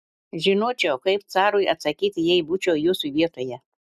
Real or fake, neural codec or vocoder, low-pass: real; none; 14.4 kHz